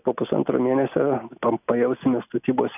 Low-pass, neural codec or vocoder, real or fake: 3.6 kHz; none; real